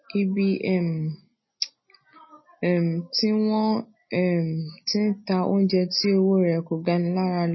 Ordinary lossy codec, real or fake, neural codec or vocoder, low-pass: MP3, 24 kbps; real; none; 7.2 kHz